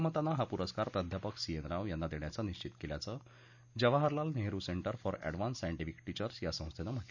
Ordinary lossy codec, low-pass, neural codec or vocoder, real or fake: none; 7.2 kHz; none; real